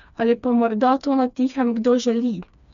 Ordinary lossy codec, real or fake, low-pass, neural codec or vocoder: none; fake; 7.2 kHz; codec, 16 kHz, 2 kbps, FreqCodec, smaller model